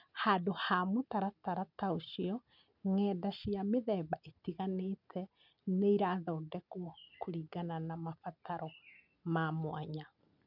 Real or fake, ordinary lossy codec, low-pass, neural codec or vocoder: real; none; 5.4 kHz; none